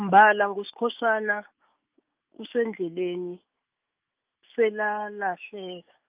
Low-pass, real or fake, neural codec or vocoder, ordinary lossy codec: 3.6 kHz; fake; autoencoder, 48 kHz, 128 numbers a frame, DAC-VAE, trained on Japanese speech; Opus, 32 kbps